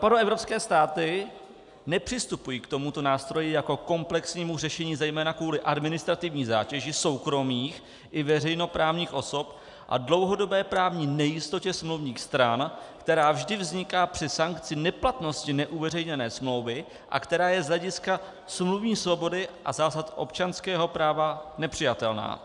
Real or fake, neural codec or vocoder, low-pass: real; none; 10.8 kHz